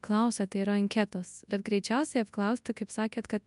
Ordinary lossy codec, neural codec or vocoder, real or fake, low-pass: MP3, 96 kbps; codec, 24 kHz, 0.9 kbps, WavTokenizer, large speech release; fake; 10.8 kHz